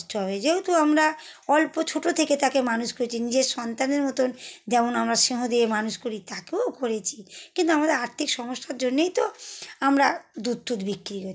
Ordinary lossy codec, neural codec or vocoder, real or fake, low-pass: none; none; real; none